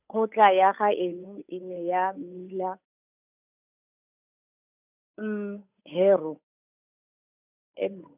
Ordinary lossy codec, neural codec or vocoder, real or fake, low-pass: none; codec, 16 kHz, 8 kbps, FunCodec, trained on Chinese and English, 25 frames a second; fake; 3.6 kHz